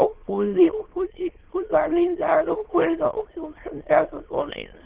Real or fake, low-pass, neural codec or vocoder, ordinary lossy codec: fake; 3.6 kHz; autoencoder, 22.05 kHz, a latent of 192 numbers a frame, VITS, trained on many speakers; Opus, 16 kbps